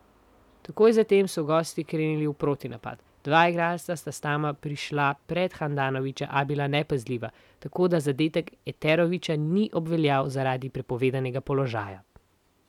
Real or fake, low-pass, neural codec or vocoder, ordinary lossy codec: real; 19.8 kHz; none; none